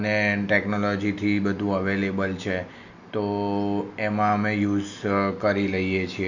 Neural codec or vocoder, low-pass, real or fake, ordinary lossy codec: none; 7.2 kHz; real; none